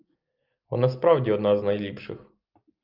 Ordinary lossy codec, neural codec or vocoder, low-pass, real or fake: Opus, 24 kbps; none; 5.4 kHz; real